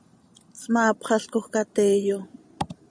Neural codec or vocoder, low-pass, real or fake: vocoder, 44.1 kHz, 128 mel bands every 512 samples, BigVGAN v2; 9.9 kHz; fake